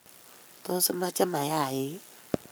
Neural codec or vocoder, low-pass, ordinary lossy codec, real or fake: codec, 44.1 kHz, 7.8 kbps, Pupu-Codec; none; none; fake